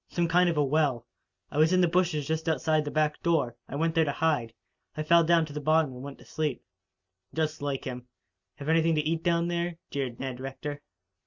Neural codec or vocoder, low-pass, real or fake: none; 7.2 kHz; real